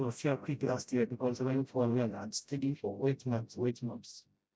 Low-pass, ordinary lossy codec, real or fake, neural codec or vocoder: none; none; fake; codec, 16 kHz, 0.5 kbps, FreqCodec, smaller model